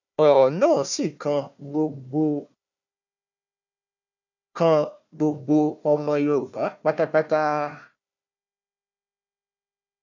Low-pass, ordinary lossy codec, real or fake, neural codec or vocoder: 7.2 kHz; none; fake; codec, 16 kHz, 1 kbps, FunCodec, trained on Chinese and English, 50 frames a second